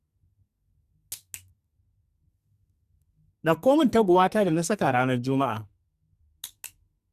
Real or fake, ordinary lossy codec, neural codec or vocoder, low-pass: fake; Opus, 64 kbps; codec, 44.1 kHz, 2.6 kbps, SNAC; 14.4 kHz